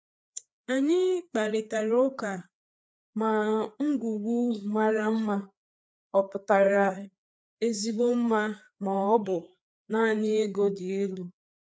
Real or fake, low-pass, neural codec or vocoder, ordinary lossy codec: fake; none; codec, 16 kHz, 4 kbps, FreqCodec, larger model; none